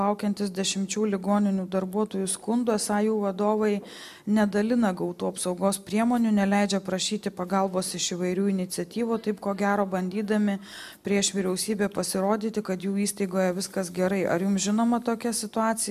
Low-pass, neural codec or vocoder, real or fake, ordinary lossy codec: 14.4 kHz; none; real; MP3, 96 kbps